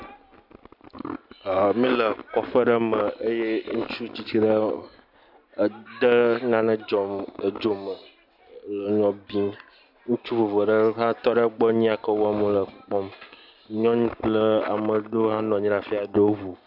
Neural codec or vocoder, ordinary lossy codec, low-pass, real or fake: none; AAC, 48 kbps; 5.4 kHz; real